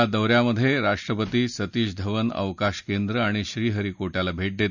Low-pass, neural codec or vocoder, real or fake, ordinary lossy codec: 7.2 kHz; none; real; none